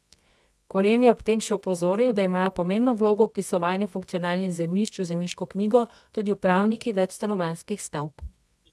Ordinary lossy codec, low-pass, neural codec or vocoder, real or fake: none; none; codec, 24 kHz, 0.9 kbps, WavTokenizer, medium music audio release; fake